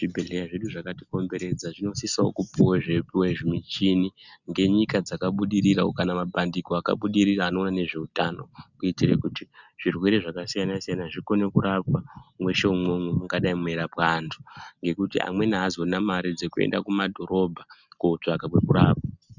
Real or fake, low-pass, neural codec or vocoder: real; 7.2 kHz; none